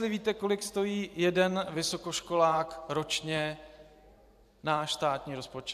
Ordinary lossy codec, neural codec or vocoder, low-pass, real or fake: AAC, 96 kbps; vocoder, 44.1 kHz, 128 mel bands every 512 samples, BigVGAN v2; 14.4 kHz; fake